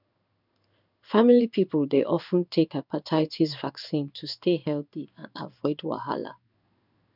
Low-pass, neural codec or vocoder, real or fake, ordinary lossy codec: 5.4 kHz; codec, 16 kHz in and 24 kHz out, 1 kbps, XY-Tokenizer; fake; none